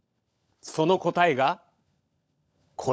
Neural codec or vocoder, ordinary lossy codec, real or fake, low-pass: codec, 16 kHz, 16 kbps, FunCodec, trained on LibriTTS, 50 frames a second; none; fake; none